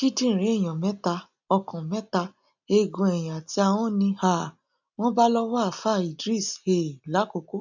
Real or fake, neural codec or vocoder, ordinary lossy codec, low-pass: real; none; none; 7.2 kHz